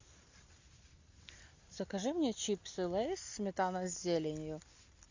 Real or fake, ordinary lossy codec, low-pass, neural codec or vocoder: real; AAC, 48 kbps; 7.2 kHz; none